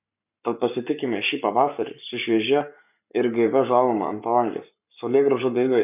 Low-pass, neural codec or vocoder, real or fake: 3.6 kHz; codec, 44.1 kHz, 7.8 kbps, Pupu-Codec; fake